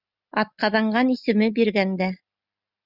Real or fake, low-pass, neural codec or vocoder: real; 5.4 kHz; none